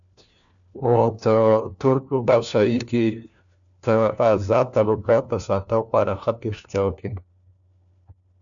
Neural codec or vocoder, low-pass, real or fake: codec, 16 kHz, 1 kbps, FunCodec, trained on LibriTTS, 50 frames a second; 7.2 kHz; fake